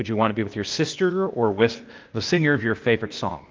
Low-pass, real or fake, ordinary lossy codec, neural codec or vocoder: 7.2 kHz; fake; Opus, 32 kbps; codec, 16 kHz, 0.8 kbps, ZipCodec